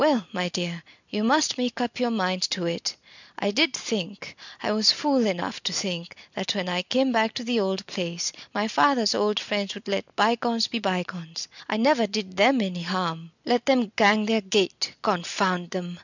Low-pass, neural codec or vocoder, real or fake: 7.2 kHz; none; real